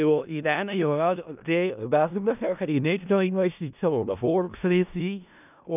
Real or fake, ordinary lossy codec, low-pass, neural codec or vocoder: fake; none; 3.6 kHz; codec, 16 kHz in and 24 kHz out, 0.4 kbps, LongCat-Audio-Codec, four codebook decoder